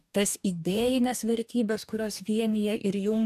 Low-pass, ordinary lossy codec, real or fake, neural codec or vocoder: 14.4 kHz; AAC, 96 kbps; fake; codec, 44.1 kHz, 2.6 kbps, DAC